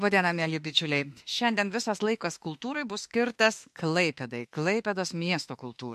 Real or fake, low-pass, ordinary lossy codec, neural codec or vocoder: fake; 14.4 kHz; MP3, 64 kbps; autoencoder, 48 kHz, 32 numbers a frame, DAC-VAE, trained on Japanese speech